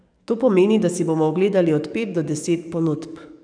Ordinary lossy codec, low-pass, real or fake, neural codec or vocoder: none; 9.9 kHz; fake; codec, 44.1 kHz, 7.8 kbps, DAC